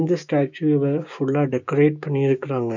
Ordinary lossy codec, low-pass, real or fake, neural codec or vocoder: none; 7.2 kHz; fake; codec, 16 kHz, 6 kbps, DAC